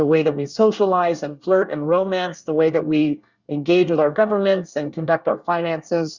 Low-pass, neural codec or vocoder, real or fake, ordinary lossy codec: 7.2 kHz; codec, 24 kHz, 1 kbps, SNAC; fake; Opus, 64 kbps